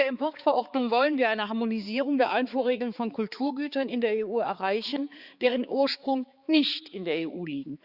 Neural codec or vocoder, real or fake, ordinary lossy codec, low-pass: codec, 16 kHz, 4 kbps, X-Codec, HuBERT features, trained on balanced general audio; fake; Opus, 64 kbps; 5.4 kHz